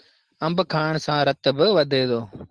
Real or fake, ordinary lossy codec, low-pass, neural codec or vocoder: real; Opus, 16 kbps; 10.8 kHz; none